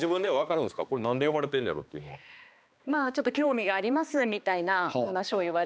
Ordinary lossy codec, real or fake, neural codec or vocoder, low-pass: none; fake; codec, 16 kHz, 2 kbps, X-Codec, HuBERT features, trained on balanced general audio; none